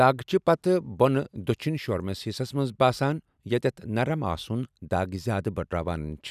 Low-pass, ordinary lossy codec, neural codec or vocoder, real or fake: 14.4 kHz; none; none; real